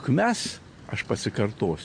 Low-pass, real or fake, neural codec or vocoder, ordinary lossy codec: 9.9 kHz; real; none; MP3, 48 kbps